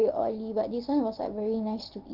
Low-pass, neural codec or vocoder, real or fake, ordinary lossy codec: 5.4 kHz; none; real; Opus, 16 kbps